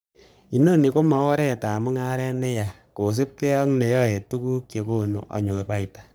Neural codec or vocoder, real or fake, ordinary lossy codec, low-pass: codec, 44.1 kHz, 3.4 kbps, Pupu-Codec; fake; none; none